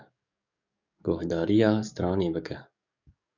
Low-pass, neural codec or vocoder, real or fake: 7.2 kHz; codec, 44.1 kHz, 7.8 kbps, DAC; fake